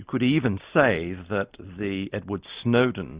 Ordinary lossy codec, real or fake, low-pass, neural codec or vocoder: Opus, 64 kbps; real; 3.6 kHz; none